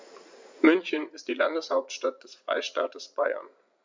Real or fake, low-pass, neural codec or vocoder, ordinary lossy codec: fake; 7.2 kHz; vocoder, 44.1 kHz, 80 mel bands, Vocos; MP3, 64 kbps